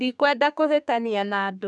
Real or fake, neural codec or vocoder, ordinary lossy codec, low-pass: fake; codec, 32 kHz, 1.9 kbps, SNAC; none; 10.8 kHz